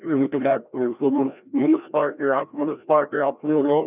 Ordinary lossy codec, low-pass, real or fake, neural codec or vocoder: none; 3.6 kHz; fake; codec, 16 kHz, 1 kbps, FreqCodec, larger model